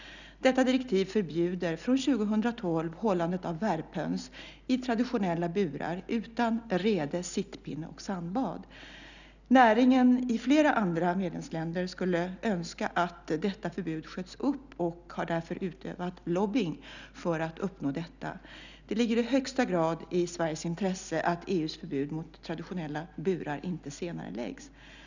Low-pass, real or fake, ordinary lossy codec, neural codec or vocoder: 7.2 kHz; real; none; none